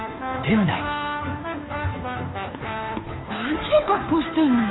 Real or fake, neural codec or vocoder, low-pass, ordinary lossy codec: fake; codec, 16 kHz, 2 kbps, FunCodec, trained on Chinese and English, 25 frames a second; 7.2 kHz; AAC, 16 kbps